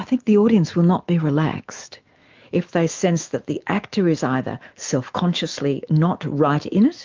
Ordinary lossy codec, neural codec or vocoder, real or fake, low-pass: Opus, 32 kbps; autoencoder, 48 kHz, 128 numbers a frame, DAC-VAE, trained on Japanese speech; fake; 7.2 kHz